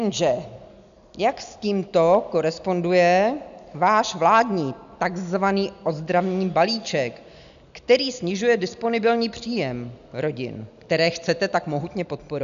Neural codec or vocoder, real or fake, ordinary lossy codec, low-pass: none; real; MP3, 96 kbps; 7.2 kHz